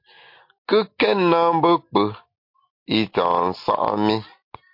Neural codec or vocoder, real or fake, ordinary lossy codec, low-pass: none; real; MP3, 32 kbps; 5.4 kHz